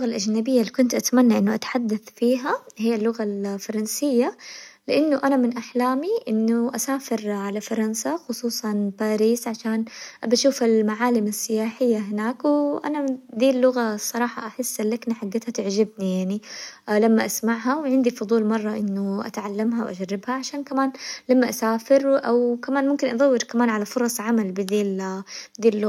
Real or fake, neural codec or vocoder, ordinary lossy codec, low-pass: real; none; none; 19.8 kHz